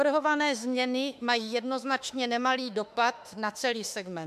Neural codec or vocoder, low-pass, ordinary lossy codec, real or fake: autoencoder, 48 kHz, 32 numbers a frame, DAC-VAE, trained on Japanese speech; 14.4 kHz; MP3, 96 kbps; fake